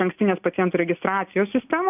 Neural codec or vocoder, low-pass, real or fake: none; 3.6 kHz; real